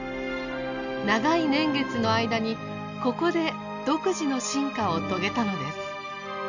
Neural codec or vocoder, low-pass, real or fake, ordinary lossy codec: none; 7.2 kHz; real; none